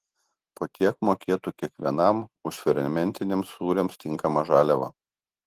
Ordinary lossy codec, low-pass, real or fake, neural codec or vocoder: Opus, 16 kbps; 14.4 kHz; real; none